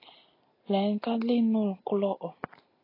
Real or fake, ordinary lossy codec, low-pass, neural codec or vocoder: real; AAC, 24 kbps; 5.4 kHz; none